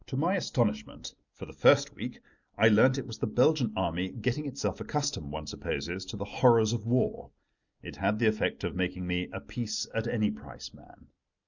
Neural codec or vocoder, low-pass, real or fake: none; 7.2 kHz; real